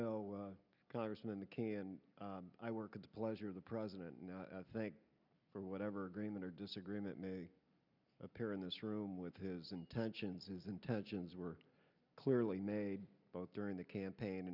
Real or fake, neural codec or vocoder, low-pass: real; none; 5.4 kHz